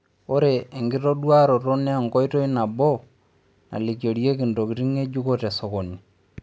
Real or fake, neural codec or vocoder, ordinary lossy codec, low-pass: real; none; none; none